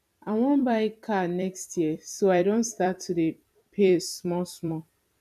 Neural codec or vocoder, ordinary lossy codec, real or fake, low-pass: vocoder, 44.1 kHz, 128 mel bands every 256 samples, BigVGAN v2; none; fake; 14.4 kHz